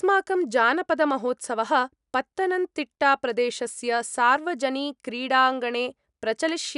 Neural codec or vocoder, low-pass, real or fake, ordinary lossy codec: none; 10.8 kHz; real; none